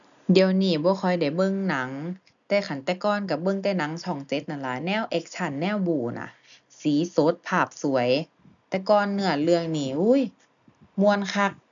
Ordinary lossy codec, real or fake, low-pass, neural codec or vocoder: none; real; 7.2 kHz; none